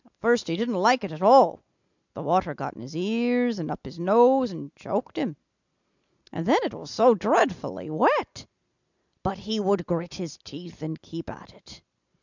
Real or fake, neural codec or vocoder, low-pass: real; none; 7.2 kHz